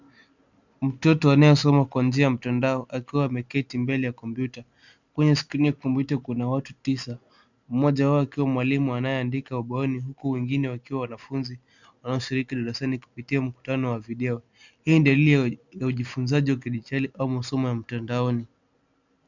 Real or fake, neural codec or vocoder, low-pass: real; none; 7.2 kHz